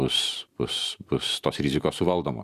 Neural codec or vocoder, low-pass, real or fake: vocoder, 44.1 kHz, 128 mel bands every 512 samples, BigVGAN v2; 14.4 kHz; fake